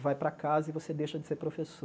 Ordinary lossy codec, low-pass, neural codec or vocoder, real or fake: none; none; none; real